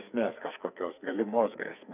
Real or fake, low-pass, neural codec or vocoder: fake; 3.6 kHz; codec, 44.1 kHz, 2.6 kbps, SNAC